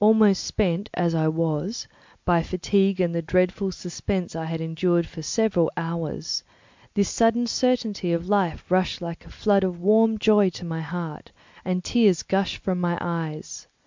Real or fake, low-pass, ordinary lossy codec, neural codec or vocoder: real; 7.2 kHz; MP3, 64 kbps; none